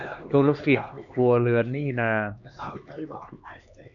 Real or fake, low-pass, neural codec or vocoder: fake; 7.2 kHz; codec, 16 kHz, 2 kbps, X-Codec, HuBERT features, trained on LibriSpeech